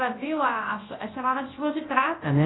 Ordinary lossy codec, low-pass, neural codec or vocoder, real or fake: AAC, 16 kbps; 7.2 kHz; codec, 24 kHz, 0.9 kbps, WavTokenizer, large speech release; fake